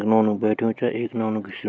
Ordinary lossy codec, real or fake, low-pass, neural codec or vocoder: none; real; none; none